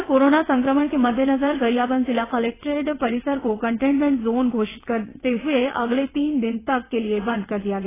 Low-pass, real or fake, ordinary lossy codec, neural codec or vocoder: 3.6 kHz; fake; AAC, 16 kbps; vocoder, 22.05 kHz, 80 mel bands, WaveNeXt